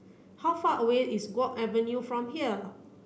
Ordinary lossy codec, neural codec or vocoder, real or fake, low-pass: none; none; real; none